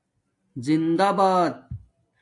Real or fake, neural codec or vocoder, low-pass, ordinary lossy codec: real; none; 10.8 kHz; AAC, 64 kbps